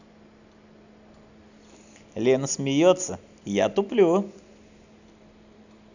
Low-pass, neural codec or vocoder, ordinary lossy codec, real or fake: 7.2 kHz; none; none; real